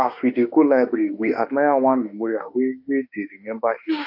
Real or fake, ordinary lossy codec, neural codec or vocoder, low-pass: fake; AAC, 48 kbps; autoencoder, 48 kHz, 32 numbers a frame, DAC-VAE, trained on Japanese speech; 5.4 kHz